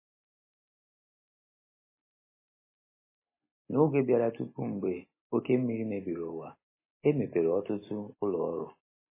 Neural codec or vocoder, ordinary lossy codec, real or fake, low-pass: none; MP3, 16 kbps; real; 3.6 kHz